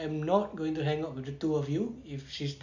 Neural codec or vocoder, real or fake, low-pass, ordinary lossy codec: none; real; 7.2 kHz; none